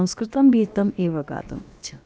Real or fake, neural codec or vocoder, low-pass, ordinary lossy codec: fake; codec, 16 kHz, about 1 kbps, DyCAST, with the encoder's durations; none; none